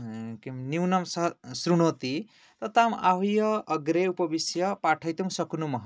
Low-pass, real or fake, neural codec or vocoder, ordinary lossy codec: none; real; none; none